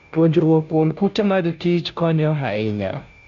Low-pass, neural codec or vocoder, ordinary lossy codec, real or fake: 7.2 kHz; codec, 16 kHz, 0.5 kbps, FunCodec, trained on Chinese and English, 25 frames a second; none; fake